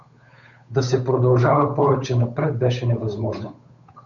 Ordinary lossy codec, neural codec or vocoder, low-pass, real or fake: MP3, 96 kbps; codec, 16 kHz, 8 kbps, FunCodec, trained on Chinese and English, 25 frames a second; 7.2 kHz; fake